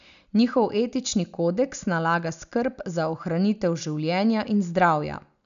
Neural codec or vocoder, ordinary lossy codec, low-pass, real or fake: none; none; 7.2 kHz; real